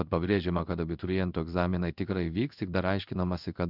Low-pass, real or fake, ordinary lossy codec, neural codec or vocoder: 5.4 kHz; fake; Opus, 64 kbps; codec, 16 kHz in and 24 kHz out, 1 kbps, XY-Tokenizer